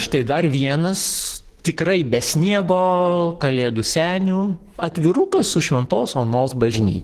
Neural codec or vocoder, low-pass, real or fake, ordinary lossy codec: codec, 44.1 kHz, 2.6 kbps, SNAC; 14.4 kHz; fake; Opus, 16 kbps